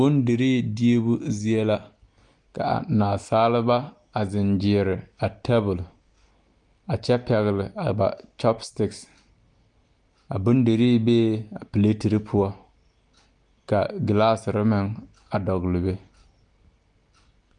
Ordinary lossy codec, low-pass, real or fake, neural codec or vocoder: Opus, 32 kbps; 10.8 kHz; real; none